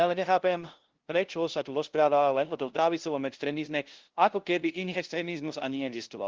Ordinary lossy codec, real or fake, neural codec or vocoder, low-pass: Opus, 16 kbps; fake; codec, 16 kHz, 0.5 kbps, FunCodec, trained on LibriTTS, 25 frames a second; 7.2 kHz